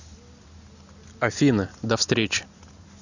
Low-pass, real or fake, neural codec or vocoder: 7.2 kHz; real; none